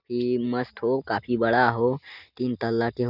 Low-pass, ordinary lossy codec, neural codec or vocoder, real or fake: 5.4 kHz; none; none; real